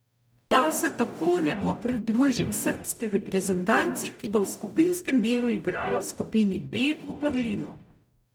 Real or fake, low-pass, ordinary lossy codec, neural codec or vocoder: fake; none; none; codec, 44.1 kHz, 0.9 kbps, DAC